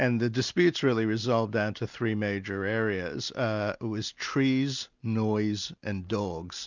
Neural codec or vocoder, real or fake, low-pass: none; real; 7.2 kHz